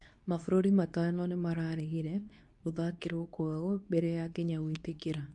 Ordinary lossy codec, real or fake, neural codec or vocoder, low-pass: MP3, 64 kbps; fake; codec, 24 kHz, 0.9 kbps, WavTokenizer, medium speech release version 2; 10.8 kHz